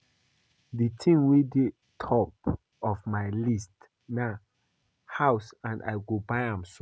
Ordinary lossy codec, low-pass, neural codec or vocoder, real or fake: none; none; none; real